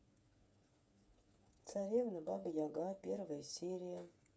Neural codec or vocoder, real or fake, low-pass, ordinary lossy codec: codec, 16 kHz, 8 kbps, FreqCodec, smaller model; fake; none; none